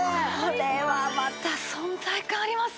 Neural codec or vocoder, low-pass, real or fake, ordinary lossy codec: none; none; real; none